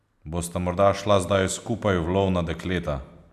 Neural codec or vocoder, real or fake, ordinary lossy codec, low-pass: none; real; none; 14.4 kHz